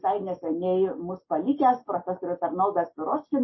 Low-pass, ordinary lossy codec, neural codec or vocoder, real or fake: 7.2 kHz; MP3, 24 kbps; none; real